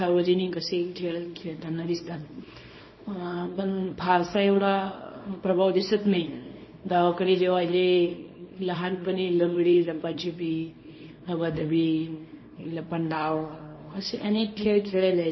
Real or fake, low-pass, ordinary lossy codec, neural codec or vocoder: fake; 7.2 kHz; MP3, 24 kbps; codec, 24 kHz, 0.9 kbps, WavTokenizer, small release